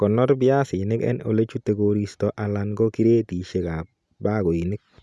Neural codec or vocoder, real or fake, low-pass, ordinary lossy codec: none; real; none; none